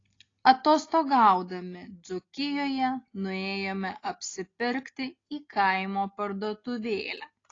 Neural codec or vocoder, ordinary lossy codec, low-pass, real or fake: none; AAC, 32 kbps; 7.2 kHz; real